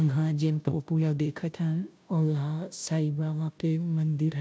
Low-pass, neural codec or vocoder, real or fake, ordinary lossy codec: none; codec, 16 kHz, 0.5 kbps, FunCodec, trained on Chinese and English, 25 frames a second; fake; none